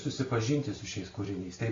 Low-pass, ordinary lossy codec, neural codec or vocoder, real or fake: 7.2 kHz; AAC, 32 kbps; none; real